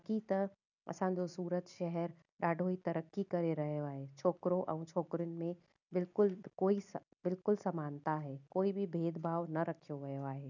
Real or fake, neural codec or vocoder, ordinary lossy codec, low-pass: real; none; none; 7.2 kHz